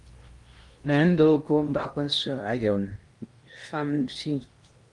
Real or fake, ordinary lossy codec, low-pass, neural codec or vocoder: fake; Opus, 32 kbps; 10.8 kHz; codec, 16 kHz in and 24 kHz out, 0.8 kbps, FocalCodec, streaming, 65536 codes